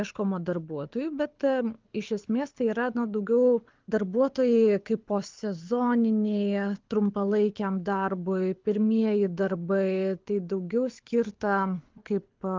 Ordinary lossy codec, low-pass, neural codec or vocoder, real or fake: Opus, 32 kbps; 7.2 kHz; codec, 24 kHz, 6 kbps, HILCodec; fake